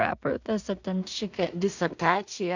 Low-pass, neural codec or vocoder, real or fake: 7.2 kHz; codec, 16 kHz in and 24 kHz out, 0.4 kbps, LongCat-Audio-Codec, two codebook decoder; fake